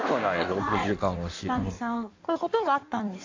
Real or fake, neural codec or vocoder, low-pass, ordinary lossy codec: fake; codec, 16 kHz in and 24 kHz out, 1.1 kbps, FireRedTTS-2 codec; 7.2 kHz; AAC, 48 kbps